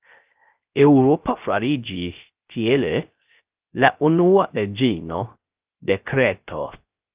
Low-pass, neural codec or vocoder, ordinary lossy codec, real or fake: 3.6 kHz; codec, 16 kHz, 0.3 kbps, FocalCodec; Opus, 24 kbps; fake